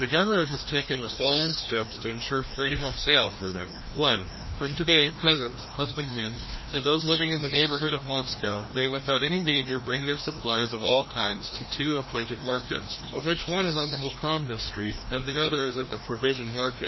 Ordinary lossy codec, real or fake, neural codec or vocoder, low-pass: MP3, 24 kbps; fake; codec, 16 kHz, 1 kbps, FreqCodec, larger model; 7.2 kHz